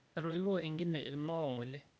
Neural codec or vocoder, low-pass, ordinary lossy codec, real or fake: codec, 16 kHz, 0.8 kbps, ZipCodec; none; none; fake